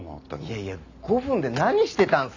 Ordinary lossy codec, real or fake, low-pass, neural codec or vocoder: none; real; 7.2 kHz; none